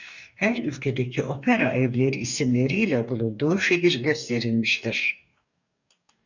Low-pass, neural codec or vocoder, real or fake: 7.2 kHz; codec, 44.1 kHz, 2.6 kbps, DAC; fake